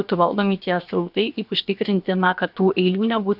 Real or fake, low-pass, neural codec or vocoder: fake; 5.4 kHz; codec, 16 kHz, 0.7 kbps, FocalCodec